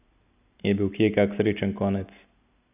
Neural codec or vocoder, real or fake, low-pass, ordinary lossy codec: none; real; 3.6 kHz; none